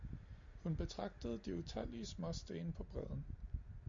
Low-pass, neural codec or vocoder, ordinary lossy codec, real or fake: 7.2 kHz; none; MP3, 48 kbps; real